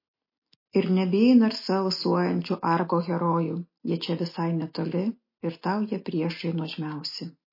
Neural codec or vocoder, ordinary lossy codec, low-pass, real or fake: none; MP3, 24 kbps; 5.4 kHz; real